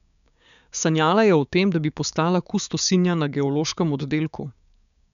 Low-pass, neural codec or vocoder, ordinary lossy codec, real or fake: 7.2 kHz; codec, 16 kHz, 6 kbps, DAC; none; fake